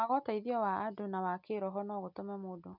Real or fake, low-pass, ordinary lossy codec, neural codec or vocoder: real; 5.4 kHz; none; none